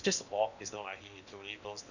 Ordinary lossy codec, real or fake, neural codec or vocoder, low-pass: none; fake; codec, 16 kHz in and 24 kHz out, 0.8 kbps, FocalCodec, streaming, 65536 codes; 7.2 kHz